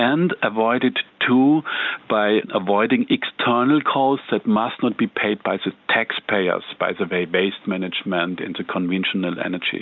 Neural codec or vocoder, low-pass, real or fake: none; 7.2 kHz; real